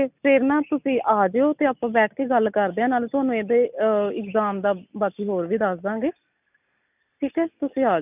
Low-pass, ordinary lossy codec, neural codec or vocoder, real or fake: 3.6 kHz; none; none; real